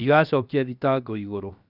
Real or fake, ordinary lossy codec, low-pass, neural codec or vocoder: fake; none; 5.4 kHz; codec, 16 kHz, 0.7 kbps, FocalCodec